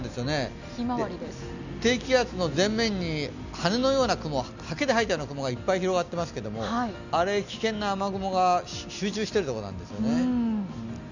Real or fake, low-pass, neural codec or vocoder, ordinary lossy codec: real; 7.2 kHz; none; none